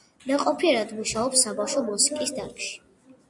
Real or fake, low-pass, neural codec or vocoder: real; 10.8 kHz; none